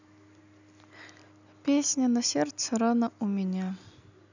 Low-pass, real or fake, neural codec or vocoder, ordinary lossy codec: 7.2 kHz; real; none; none